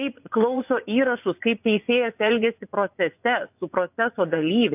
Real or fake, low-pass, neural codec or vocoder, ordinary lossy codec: fake; 3.6 kHz; vocoder, 24 kHz, 100 mel bands, Vocos; AAC, 32 kbps